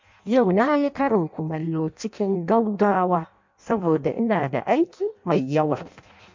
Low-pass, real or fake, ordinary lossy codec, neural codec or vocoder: 7.2 kHz; fake; MP3, 48 kbps; codec, 16 kHz in and 24 kHz out, 0.6 kbps, FireRedTTS-2 codec